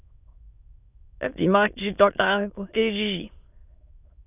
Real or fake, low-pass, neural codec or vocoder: fake; 3.6 kHz; autoencoder, 22.05 kHz, a latent of 192 numbers a frame, VITS, trained on many speakers